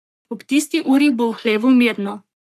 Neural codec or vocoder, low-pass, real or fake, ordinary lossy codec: codec, 44.1 kHz, 3.4 kbps, Pupu-Codec; 14.4 kHz; fake; AAC, 96 kbps